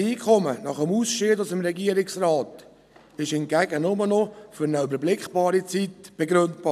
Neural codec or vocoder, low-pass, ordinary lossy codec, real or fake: none; 14.4 kHz; none; real